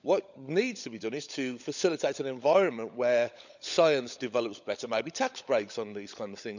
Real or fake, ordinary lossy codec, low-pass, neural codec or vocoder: fake; none; 7.2 kHz; codec, 16 kHz, 16 kbps, FunCodec, trained on LibriTTS, 50 frames a second